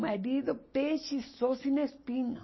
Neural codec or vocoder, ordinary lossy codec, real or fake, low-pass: none; MP3, 24 kbps; real; 7.2 kHz